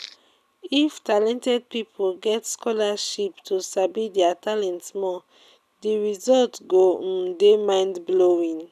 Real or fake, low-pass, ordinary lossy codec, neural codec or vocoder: real; 14.4 kHz; none; none